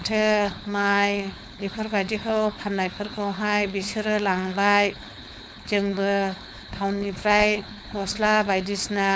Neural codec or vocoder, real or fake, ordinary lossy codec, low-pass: codec, 16 kHz, 4.8 kbps, FACodec; fake; none; none